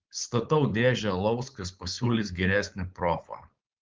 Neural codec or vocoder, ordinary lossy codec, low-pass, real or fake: codec, 16 kHz, 4.8 kbps, FACodec; Opus, 32 kbps; 7.2 kHz; fake